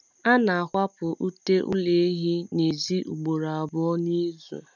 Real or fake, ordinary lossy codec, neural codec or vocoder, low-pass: real; none; none; 7.2 kHz